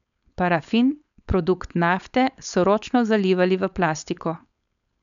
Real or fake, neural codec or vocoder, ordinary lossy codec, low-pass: fake; codec, 16 kHz, 4.8 kbps, FACodec; none; 7.2 kHz